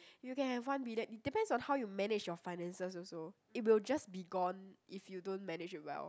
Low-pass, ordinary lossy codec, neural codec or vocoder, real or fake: none; none; none; real